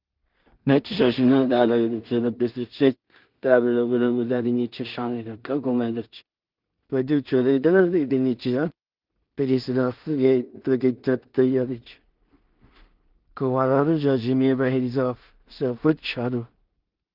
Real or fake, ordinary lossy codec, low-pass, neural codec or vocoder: fake; Opus, 32 kbps; 5.4 kHz; codec, 16 kHz in and 24 kHz out, 0.4 kbps, LongCat-Audio-Codec, two codebook decoder